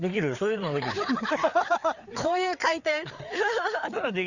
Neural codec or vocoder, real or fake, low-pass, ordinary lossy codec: codec, 16 kHz, 4 kbps, FreqCodec, larger model; fake; 7.2 kHz; none